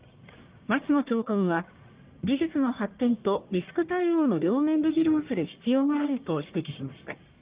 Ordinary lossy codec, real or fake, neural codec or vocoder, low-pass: Opus, 32 kbps; fake; codec, 44.1 kHz, 1.7 kbps, Pupu-Codec; 3.6 kHz